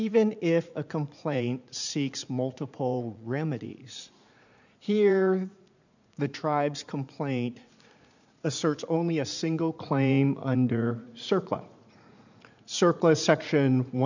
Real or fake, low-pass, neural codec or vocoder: fake; 7.2 kHz; vocoder, 44.1 kHz, 80 mel bands, Vocos